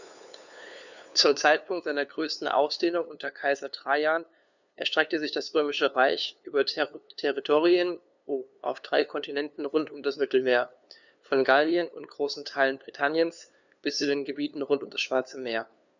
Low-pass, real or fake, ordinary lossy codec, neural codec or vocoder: 7.2 kHz; fake; none; codec, 16 kHz, 2 kbps, FunCodec, trained on LibriTTS, 25 frames a second